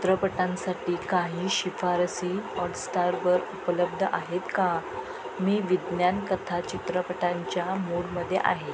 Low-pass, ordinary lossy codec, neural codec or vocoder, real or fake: none; none; none; real